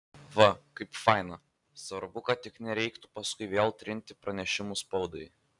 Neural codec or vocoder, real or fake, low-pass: none; real; 10.8 kHz